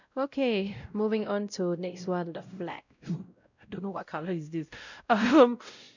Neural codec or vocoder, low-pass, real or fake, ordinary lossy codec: codec, 16 kHz, 0.5 kbps, X-Codec, WavLM features, trained on Multilingual LibriSpeech; 7.2 kHz; fake; none